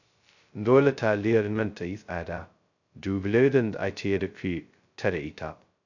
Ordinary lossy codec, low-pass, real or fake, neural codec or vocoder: none; 7.2 kHz; fake; codec, 16 kHz, 0.2 kbps, FocalCodec